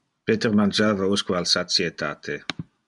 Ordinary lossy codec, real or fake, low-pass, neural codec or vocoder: MP3, 96 kbps; real; 10.8 kHz; none